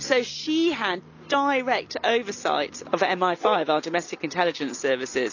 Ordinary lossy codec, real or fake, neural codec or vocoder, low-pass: MP3, 64 kbps; real; none; 7.2 kHz